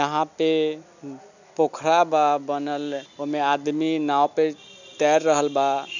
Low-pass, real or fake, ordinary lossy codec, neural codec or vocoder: 7.2 kHz; real; none; none